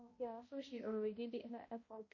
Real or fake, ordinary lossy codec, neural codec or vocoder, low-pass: fake; MP3, 32 kbps; codec, 16 kHz, 0.5 kbps, X-Codec, HuBERT features, trained on balanced general audio; 7.2 kHz